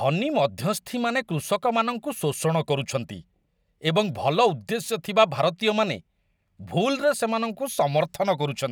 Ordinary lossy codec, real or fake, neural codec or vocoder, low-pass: none; real; none; none